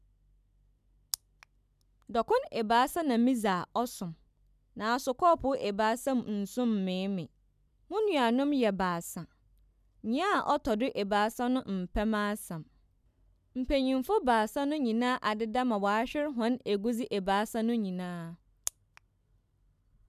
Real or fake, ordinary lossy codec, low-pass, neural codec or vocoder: real; none; 14.4 kHz; none